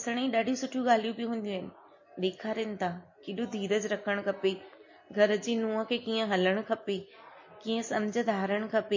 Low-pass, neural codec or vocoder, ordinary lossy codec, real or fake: 7.2 kHz; none; MP3, 32 kbps; real